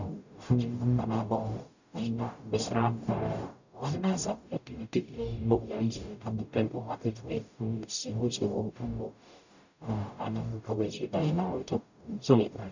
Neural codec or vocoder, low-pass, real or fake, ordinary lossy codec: codec, 44.1 kHz, 0.9 kbps, DAC; 7.2 kHz; fake; none